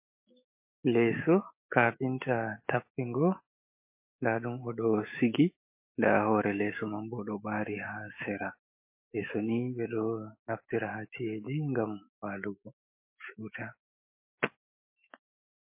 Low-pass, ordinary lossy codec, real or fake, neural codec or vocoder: 3.6 kHz; MP3, 24 kbps; fake; autoencoder, 48 kHz, 128 numbers a frame, DAC-VAE, trained on Japanese speech